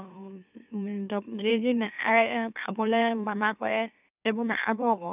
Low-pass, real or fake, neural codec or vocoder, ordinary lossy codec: 3.6 kHz; fake; autoencoder, 44.1 kHz, a latent of 192 numbers a frame, MeloTTS; none